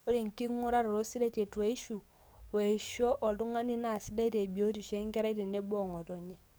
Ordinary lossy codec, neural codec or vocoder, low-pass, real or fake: none; codec, 44.1 kHz, 7.8 kbps, DAC; none; fake